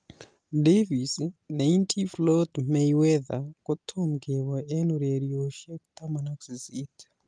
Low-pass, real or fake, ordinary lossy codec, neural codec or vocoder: 9.9 kHz; real; Opus, 32 kbps; none